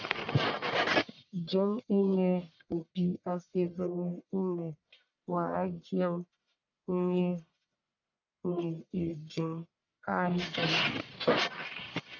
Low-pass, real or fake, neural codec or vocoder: 7.2 kHz; fake; codec, 44.1 kHz, 1.7 kbps, Pupu-Codec